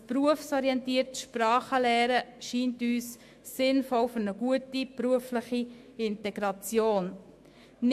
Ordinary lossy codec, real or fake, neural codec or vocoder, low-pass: MP3, 64 kbps; fake; autoencoder, 48 kHz, 128 numbers a frame, DAC-VAE, trained on Japanese speech; 14.4 kHz